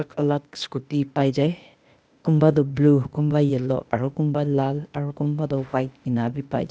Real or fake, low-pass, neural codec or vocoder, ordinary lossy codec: fake; none; codec, 16 kHz, 0.8 kbps, ZipCodec; none